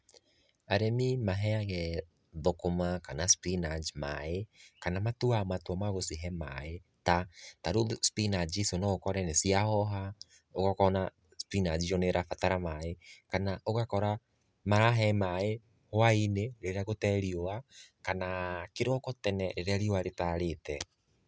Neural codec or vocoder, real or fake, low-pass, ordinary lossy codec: none; real; none; none